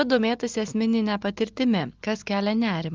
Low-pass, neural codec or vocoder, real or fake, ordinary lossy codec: 7.2 kHz; none; real; Opus, 32 kbps